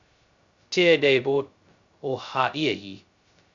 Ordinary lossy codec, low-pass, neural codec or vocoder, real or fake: Opus, 64 kbps; 7.2 kHz; codec, 16 kHz, 0.2 kbps, FocalCodec; fake